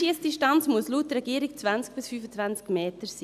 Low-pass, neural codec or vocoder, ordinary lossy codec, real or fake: 14.4 kHz; none; none; real